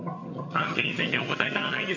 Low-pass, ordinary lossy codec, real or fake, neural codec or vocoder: 7.2 kHz; MP3, 48 kbps; fake; vocoder, 22.05 kHz, 80 mel bands, HiFi-GAN